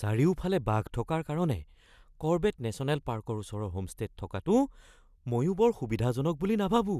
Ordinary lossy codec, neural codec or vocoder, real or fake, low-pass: none; none; real; 14.4 kHz